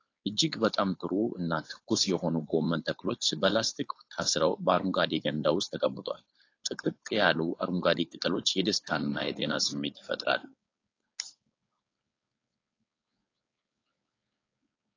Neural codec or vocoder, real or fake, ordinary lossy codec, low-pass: codec, 16 kHz, 4.8 kbps, FACodec; fake; AAC, 32 kbps; 7.2 kHz